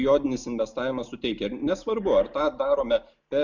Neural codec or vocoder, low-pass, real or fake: none; 7.2 kHz; real